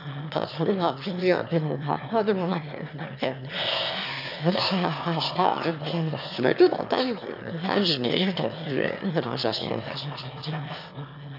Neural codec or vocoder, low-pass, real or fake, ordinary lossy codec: autoencoder, 22.05 kHz, a latent of 192 numbers a frame, VITS, trained on one speaker; 5.4 kHz; fake; none